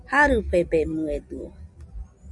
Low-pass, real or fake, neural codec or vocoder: 10.8 kHz; real; none